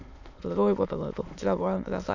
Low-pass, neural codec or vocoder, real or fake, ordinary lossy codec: 7.2 kHz; autoencoder, 22.05 kHz, a latent of 192 numbers a frame, VITS, trained on many speakers; fake; none